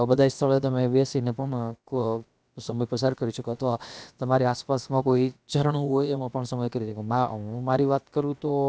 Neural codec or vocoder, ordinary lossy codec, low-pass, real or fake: codec, 16 kHz, about 1 kbps, DyCAST, with the encoder's durations; none; none; fake